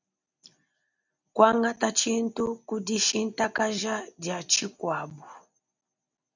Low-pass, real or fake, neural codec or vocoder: 7.2 kHz; real; none